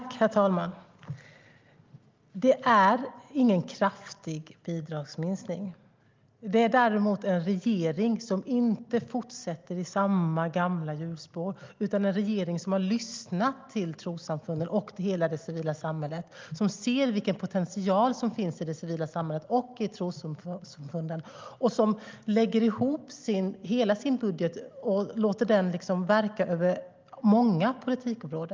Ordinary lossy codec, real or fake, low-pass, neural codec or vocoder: Opus, 32 kbps; real; 7.2 kHz; none